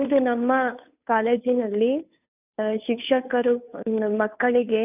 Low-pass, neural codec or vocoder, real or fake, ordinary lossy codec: 3.6 kHz; codec, 16 kHz, 2 kbps, FunCodec, trained on Chinese and English, 25 frames a second; fake; none